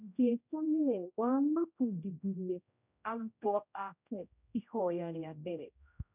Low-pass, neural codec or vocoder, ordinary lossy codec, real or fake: 3.6 kHz; codec, 16 kHz, 0.5 kbps, X-Codec, HuBERT features, trained on general audio; none; fake